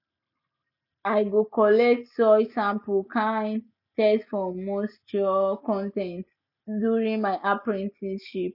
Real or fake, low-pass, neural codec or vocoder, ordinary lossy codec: real; 5.4 kHz; none; none